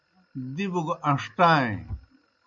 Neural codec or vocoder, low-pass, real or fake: none; 7.2 kHz; real